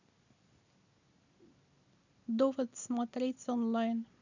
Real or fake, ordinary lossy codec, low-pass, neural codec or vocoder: real; none; 7.2 kHz; none